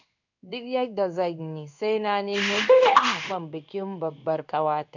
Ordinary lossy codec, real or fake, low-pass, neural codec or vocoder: none; fake; 7.2 kHz; codec, 16 kHz in and 24 kHz out, 1 kbps, XY-Tokenizer